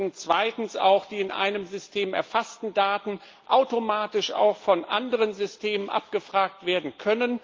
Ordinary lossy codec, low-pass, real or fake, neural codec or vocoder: Opus, 32 kbps; 7.2 kHz; real; none